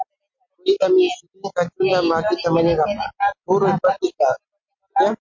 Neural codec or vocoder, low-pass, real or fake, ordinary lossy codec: none; 7.2 kHz; real; MP3, 48 kbps